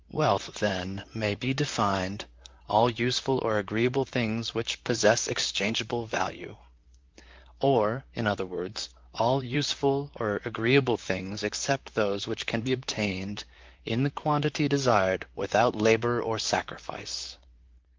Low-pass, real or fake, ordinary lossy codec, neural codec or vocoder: 7.2 kHz; fake; Opus, 32 kbps; vocoder, 44.1 kHz, 128 mel bands, Pupu-Vocoder